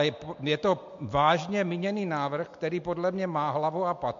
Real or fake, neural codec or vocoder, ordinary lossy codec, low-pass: real; none; MP3, 48 kbps; 7.2 kHz